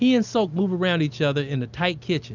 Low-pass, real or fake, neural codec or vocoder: 7.2 kHz; real; none